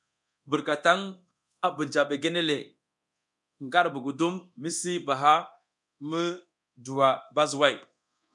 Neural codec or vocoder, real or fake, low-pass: codec, 24 kHz, 0.9 kbps, DualCodec; fake; 10.8 kHz